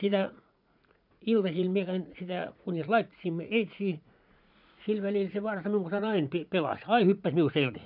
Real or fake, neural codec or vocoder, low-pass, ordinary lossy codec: fake; vocoder, 44.1 kHz, 80 mel bands, Vocos; 5.4 kHz; none